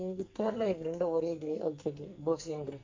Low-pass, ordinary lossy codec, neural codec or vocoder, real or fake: 7.2 kHz; MP3, 64 kbps; codec, 44.1 kHz, 2.6 kbps, SNAC; fake